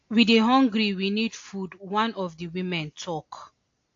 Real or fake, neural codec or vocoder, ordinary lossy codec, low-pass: real; none; AAC, 48 kbps; 7.2 kHz